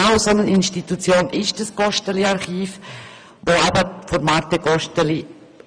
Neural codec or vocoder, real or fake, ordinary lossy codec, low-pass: vocoder, 24 kHz, 100 mel bands, Vocos; fake; none; 9.9 kHz